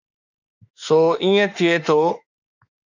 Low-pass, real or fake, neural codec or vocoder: 7.2 kHz; fake; autoencoder, 48 kHz, 32 numbers a frame, DAC-VAE, trained on Japanese speech